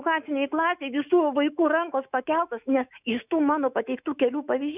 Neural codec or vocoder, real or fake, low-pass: autoencoder, 48 kHz, 128 numbers a frame, DAC-VAE, trained on Japanese speech; fake; 3.6 kHz